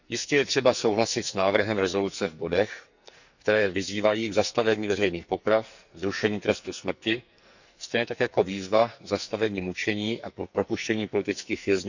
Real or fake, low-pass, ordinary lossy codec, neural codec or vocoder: fake; 7.2 kHz; none; codec, 44.1 kHz, 2.6 kbps, SNAC